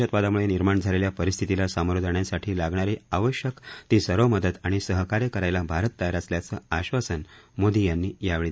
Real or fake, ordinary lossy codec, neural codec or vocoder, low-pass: real; none; none; none